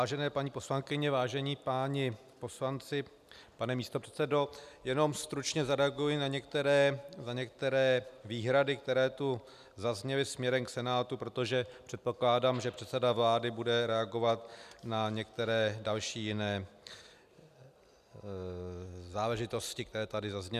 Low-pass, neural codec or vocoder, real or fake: 14.4 kHz; none; real